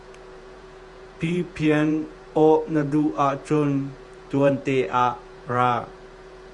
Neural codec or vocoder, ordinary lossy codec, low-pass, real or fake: vocoder, 48 kHz, 128 mel bands, Vocos; Opus, 64 kbps; 10.8 kHz; fake